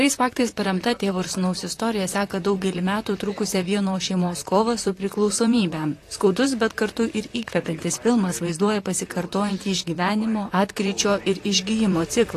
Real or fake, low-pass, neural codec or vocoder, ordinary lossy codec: fake; 14.4 kHz; vocoder, 44.1 kHz, 128 mel bands, Pupu-Vocoder; AAC, 48 kbps